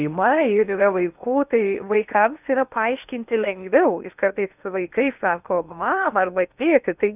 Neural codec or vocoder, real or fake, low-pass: codec, 16 kHz in and 24 kHz out, 0.8 kbps, FocalCodec, streaming, 65536 codes; fake; 3.6 kHz